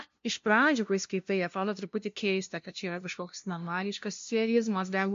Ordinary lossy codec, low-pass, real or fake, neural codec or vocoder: MP3, 48 kbps; 7.2 kHz; fake; codec, 16 kHz, 0.5 kbps, FunCodec, trained on LibriTTS, 25 frames a second